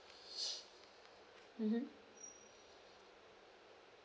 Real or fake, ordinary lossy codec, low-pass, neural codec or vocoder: real; none; none; none